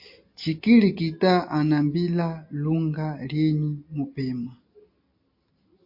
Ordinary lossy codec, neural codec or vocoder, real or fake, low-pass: MP3, 32 kbps; none; real; 5.4 kHz